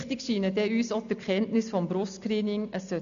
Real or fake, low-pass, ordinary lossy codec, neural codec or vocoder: real; 7.2 kHz; none; none